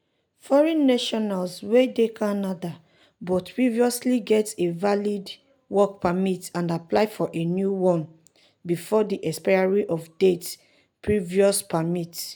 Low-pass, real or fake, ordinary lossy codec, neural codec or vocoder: none; real; none; none